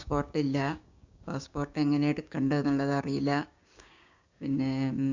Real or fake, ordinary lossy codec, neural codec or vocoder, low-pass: fake; Opus, 64 kbps; codec, 16 kHz, 6 kbps, DAC; 7.2 kHz